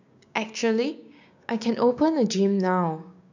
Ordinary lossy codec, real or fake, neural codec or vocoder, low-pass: none; fake; codec, 16 kHz, 6 kbps, DAC; 7.2 kHz